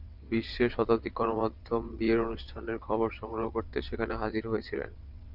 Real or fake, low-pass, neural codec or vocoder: fake; 5.4 kHz; vocoder, 22.05 kHz, 80 mel bands, WaveNeXt